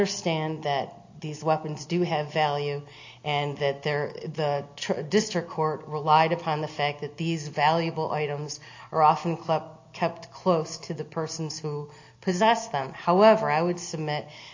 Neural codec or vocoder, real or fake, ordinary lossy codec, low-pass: none; real; AAC, 48 kbps; 7.2 kHz